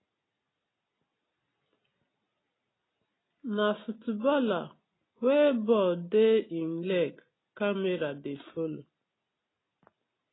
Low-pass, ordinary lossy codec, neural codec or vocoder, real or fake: 7.2 kHz; AAC, 16 kbps; none; real